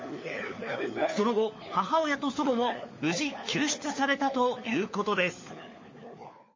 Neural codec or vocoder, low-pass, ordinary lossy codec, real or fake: codec, 16 kHz, 4 kbps, FunCodec, trained on LibriTTS, 50 frames a second; 7.2 kHz; MP3, 32 kbps; fake